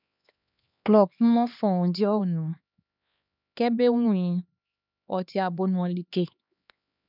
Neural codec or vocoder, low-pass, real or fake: codec, 16 kHz, 2 kbps, X-Codec, HuBERT features, trained on LibriSpeech; 5.4 kHz; fake